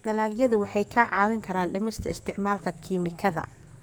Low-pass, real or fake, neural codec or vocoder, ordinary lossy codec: none; fake; codec, 44.1 kHz, 2.6 kbps, SNAC; none